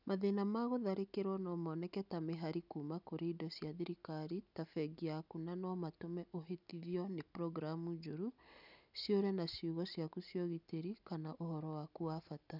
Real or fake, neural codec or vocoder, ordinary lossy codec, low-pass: real; none; none; 5.4 kHz